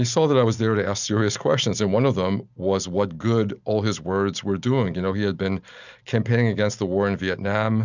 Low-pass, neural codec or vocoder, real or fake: 7.2 kHz; none; real